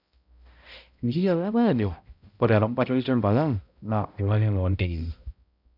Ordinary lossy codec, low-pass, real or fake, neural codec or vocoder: none; 5.4 kHz; fake; codec, 16 kHz, 0.5 kbps, X-Codec, HuBERT features, trained on balanced general audio